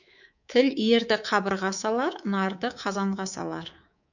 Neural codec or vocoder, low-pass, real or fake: codec, 24 kHz, 3.1 kbps, DualCodec; 7.2 kHz; fake